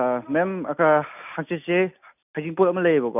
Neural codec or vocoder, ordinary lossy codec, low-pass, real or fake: none; none; 3.6 kHz; real